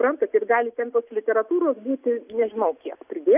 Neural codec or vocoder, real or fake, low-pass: none; real; 3.6 kHz